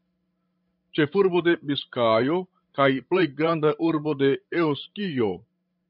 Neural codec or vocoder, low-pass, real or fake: codec, 16 kHz, 16 kbps, FreqCodec, larger model; 5.4 kHz; fake